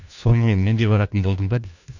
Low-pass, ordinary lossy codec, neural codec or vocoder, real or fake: 7.2 kHz; none; codec, 16 kHz, 1 kbps, FunCodec, trained on LibriTTS, 50 frames a second; fake